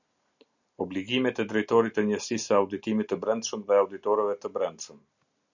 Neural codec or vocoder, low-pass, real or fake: none; 7.2 kHz; real